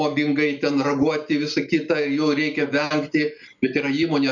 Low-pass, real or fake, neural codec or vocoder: 7.2 kHz; real; none